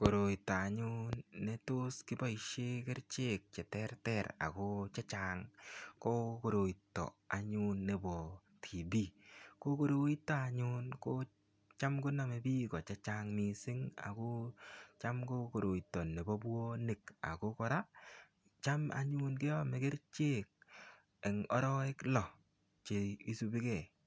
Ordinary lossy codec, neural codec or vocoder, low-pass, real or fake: none; none; none; real